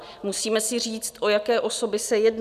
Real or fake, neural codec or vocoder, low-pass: real; none; 14.4 kHz